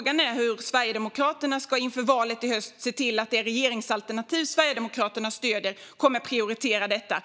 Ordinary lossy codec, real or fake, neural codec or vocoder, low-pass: none; real; none; none